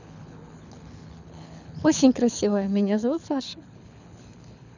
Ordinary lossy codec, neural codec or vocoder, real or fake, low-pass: none; codec, 24 kHz, 6 kbps, HILCodec; fake; 7.2 kHz